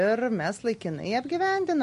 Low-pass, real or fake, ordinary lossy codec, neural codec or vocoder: 10.8 kHz; real; MP3, 48 kbps; none